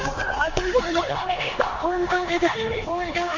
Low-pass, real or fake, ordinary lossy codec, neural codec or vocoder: 7.2 kHz; fake; none; codec, 16 kHz in and 24 kHz out, 0.9 kbps, LongCat-Audio-Codec, four codebook decoder